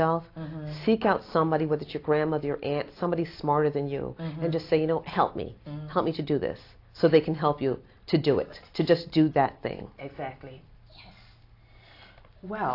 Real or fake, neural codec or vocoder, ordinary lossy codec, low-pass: real; none; AAC, 32 kbps; 5.4 kHz